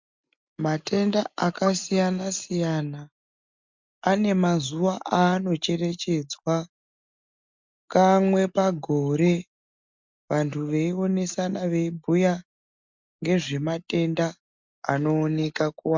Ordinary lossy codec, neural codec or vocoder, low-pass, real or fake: MP3, 64 kbps; none; 7.2 kHz; real